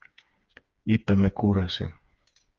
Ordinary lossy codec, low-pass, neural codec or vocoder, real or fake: Opus, 24 kbps; 7.2 kHz; codec, 16 kHz, 4 kbps, FreqCodec, smaller model; fake